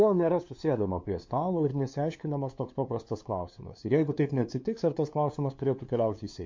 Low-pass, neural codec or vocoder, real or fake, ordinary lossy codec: 7.2 kHz; codec, 16 kHz, 2 kbps, FunCodec, trained on LibriTTS, 25 frames a second; fake; MP3, 48 kbps